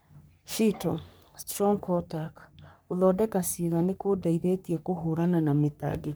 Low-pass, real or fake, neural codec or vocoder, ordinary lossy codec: none; fake; codec, 44.1 kHz, 3.4 kbps, Pupu-Codec; none